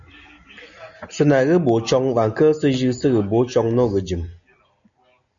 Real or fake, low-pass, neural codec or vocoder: real; 7.2 kHz; none